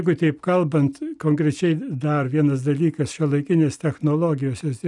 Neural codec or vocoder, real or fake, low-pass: none; real; 10.8 kHz